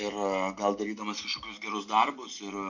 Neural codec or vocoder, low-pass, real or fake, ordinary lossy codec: none; 7.2 kHz; real; MP3, 48 kbps